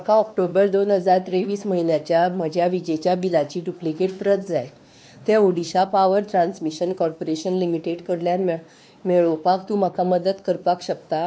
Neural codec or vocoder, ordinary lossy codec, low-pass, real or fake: codec, 16 kHz, 2 kbps, X-Codec, WavLM features, trained on Multilingual LibriSpeech; none; none; fake